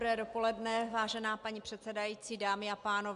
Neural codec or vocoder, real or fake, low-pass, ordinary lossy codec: none; real; 10.8 kHz; MP3, 64 kbps